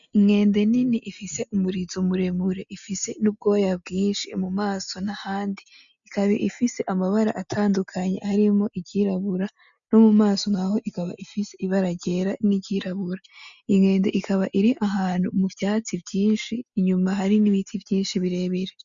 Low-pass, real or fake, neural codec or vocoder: 7.2 kHz; real; none